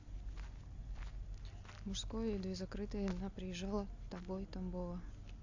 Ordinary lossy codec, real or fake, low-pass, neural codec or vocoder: AAC, 48 kbps; real; 7.2 kHz; none